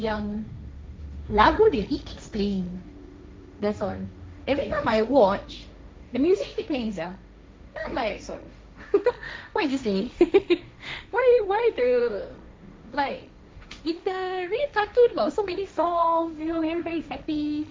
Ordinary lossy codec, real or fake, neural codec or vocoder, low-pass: none; fake; codec, 16 kHz, 1.1 kbps, Voila-Tokenizer; none